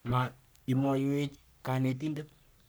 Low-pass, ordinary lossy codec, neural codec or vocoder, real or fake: none; none; codec, 44.1 kHz, 3.4 kbps, Pupu-Codec; fake